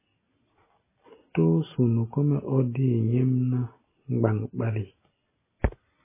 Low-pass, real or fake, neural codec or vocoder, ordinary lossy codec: 3.6 kHz; real; none; MP3, 16 kbps